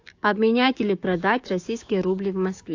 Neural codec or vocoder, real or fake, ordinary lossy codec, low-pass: codec, 16 kHz, 6 kbps, DAC; fake; AAC, 48 kbps; 7.2 kHz